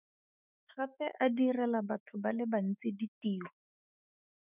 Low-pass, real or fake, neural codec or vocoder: 3.6 kHz; fake; autoencoder, 48 kHz, 128 numbers a frame, DAC-VAE, trained on Japanese speech